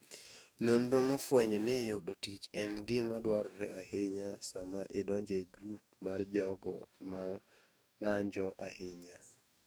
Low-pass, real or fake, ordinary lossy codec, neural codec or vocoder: none; fake; none; codec, 44.1 kHz, 2.6 kbps, DAC